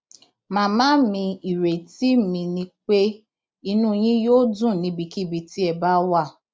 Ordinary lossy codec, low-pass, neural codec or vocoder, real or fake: none; none; none; real